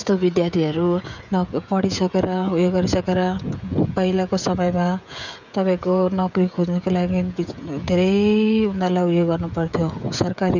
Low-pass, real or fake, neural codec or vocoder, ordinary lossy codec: 7.2 kHz; fake; codec, 16 kHz, 16 kbps, FreqCodec, smaller model; none